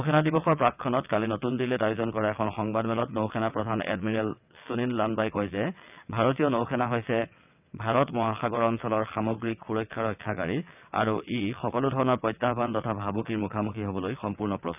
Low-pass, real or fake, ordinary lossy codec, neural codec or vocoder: 3.6 kHz; fake; none; vocoder, 22.05 kHz, 80 mel bands, WaveNeXt